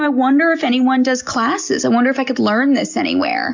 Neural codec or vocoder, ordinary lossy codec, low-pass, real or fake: none; MP3, 64 kbps; 7.2 kHz; real